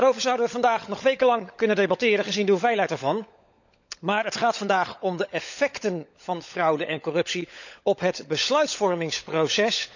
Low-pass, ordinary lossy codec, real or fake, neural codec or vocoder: 7.2 kHz; none; fake; codec, 16 kHz, 16 kbps, FunCodec, trained on LibriTTS, 50 frames a second